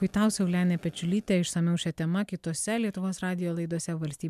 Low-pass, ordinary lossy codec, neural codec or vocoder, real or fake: 14.4 kHz; MP3, 96 kbps; none; real